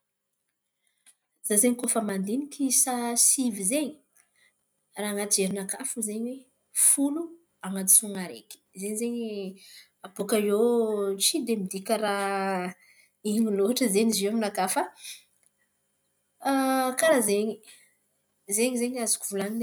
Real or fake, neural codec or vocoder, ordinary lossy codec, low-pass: real; none; none; none